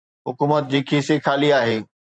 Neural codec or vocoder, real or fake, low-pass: vocoder, 44.1 kHz, 128 mel bands every 512 samples, BigVGAN v2; fake; 9.9 kHz